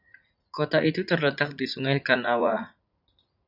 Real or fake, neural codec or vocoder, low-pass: fake; vocoder, 44.1 kHz, 80 mel bands, Vocos; 5.4 kHz